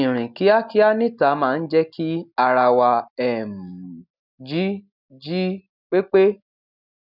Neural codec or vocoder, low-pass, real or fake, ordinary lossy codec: none; 5.4 kHz; real; none